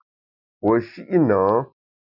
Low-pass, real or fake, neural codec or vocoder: 5.4 kHz; real; none